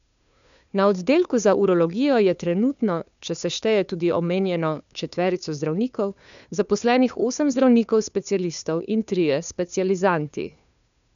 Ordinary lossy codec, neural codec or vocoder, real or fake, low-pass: none; codec, 16 kHz, 2 kbps, FunCodec, trained on Chinese and English, 25 frames a second; fake; 7.2 kHz